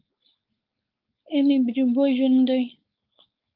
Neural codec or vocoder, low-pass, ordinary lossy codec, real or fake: codec, 16 kHz, 4.8 kbps, FACodec; 5.4 kHz; Opus, 24 kbps; fake